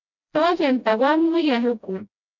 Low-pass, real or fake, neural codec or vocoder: 7.2 kHz; fake; codec, 16 kHz, 0.5 kbps, FreqCodec, smaller model